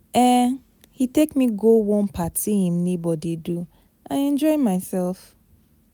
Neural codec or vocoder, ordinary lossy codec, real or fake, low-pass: none; none; real; none